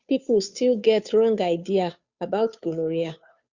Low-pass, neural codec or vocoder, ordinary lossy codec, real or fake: 7.2 kHz; codec, 16 kHz, 2 kbps, FunCodec, trained on Chinese and English, 25 frames a second; Opus, 64 kbps; fake